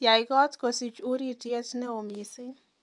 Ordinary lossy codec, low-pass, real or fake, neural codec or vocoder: none; 10.8 kHz; fake; vocoder, 24 kHz, 100 mel bands, Vocos